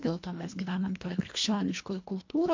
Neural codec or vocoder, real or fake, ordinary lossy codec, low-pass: codec, 24 kHz, 1.5 kbps, HILCodec; fake; MP3, 64 kbps; 7.2 kHz